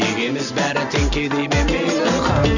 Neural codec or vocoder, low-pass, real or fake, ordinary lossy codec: none; 7.2 kHz; real; none